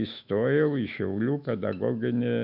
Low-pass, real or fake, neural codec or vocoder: 5.4 kHz; fake; autoencoder, 48 kHz, 128 numbers a frame, DAC-VAE, trained on Japanese speech